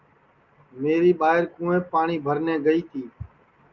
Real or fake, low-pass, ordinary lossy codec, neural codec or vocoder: real; 7.2 kHz; Opus, 24 kbps; none